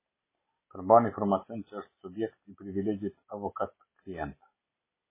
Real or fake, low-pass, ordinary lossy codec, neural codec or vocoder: real; 3.6 kHz; MP3, 16 kbps; none